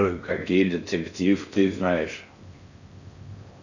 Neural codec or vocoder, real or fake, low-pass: codec, 16 kHz in and 24 kHz out, 0.6 kbps, FocalCodec, streaming, 4096 codes; fake; 7.2 kHz